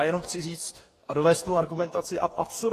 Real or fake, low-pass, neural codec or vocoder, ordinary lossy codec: fake; 14.4 kHz; codec, 44.1 kHz, 2.6 kbps, DAC; AAC, 48 kbps